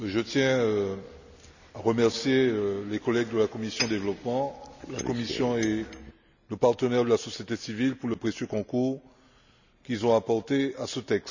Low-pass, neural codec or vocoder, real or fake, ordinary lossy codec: 7.2 kHz; none; real; none